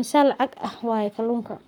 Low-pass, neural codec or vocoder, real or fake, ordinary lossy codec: 19.8 kHz; codec, 44.1 kHz, 7.8 kbps, Pupu-Codec; fake; none